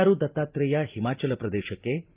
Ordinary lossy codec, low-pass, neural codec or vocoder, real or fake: Opus, 32 kbps; 3.6 kHz; none; real